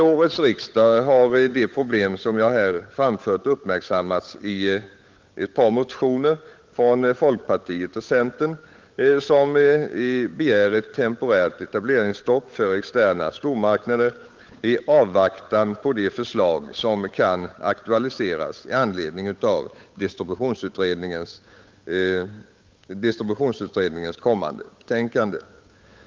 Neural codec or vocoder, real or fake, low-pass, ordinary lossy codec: codec, 16 kHz, 8 kbps, FunCodec, trained on Chinese and English, 25 frames a second; fake; 7.2 kHz; Opus, 32 kbps